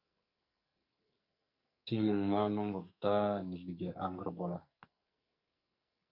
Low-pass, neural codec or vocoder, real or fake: 5.4 kHz; codec, 44.1 kHz, 2.6 kbps, SNAC; fake